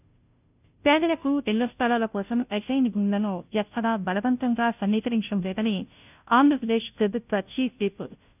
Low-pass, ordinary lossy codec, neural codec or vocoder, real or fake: 3.6 kHz; none; codec, 16 kHz, 0.5 kbps, FunCodec, trained on Chinese and English, 25 frames a second; fake